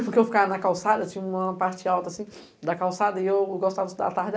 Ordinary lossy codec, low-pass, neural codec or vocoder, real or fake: none; none; none; real